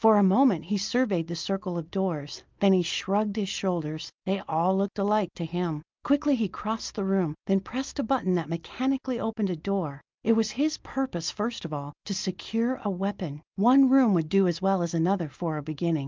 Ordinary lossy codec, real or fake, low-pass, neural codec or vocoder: Opus, 24 kbps; real; 7.2 kHz; none